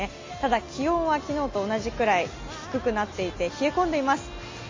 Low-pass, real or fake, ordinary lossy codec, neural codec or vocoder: 7.2 kHz; real; MP3, 32 kbps; none